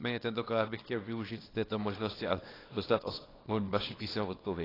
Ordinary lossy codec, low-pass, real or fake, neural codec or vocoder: AAC, 24 kbps; 5.4 kHz; fake; codec, 24 kHz, 0.9 kbps, WavTokenizer, small release